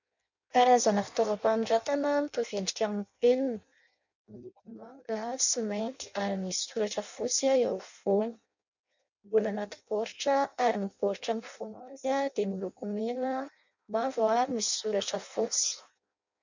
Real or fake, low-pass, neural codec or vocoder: fake; 7.2 kHz; codec, 16 kHz in and 24 kHz out, 0.6 kbps, FireRedTTS-2 codec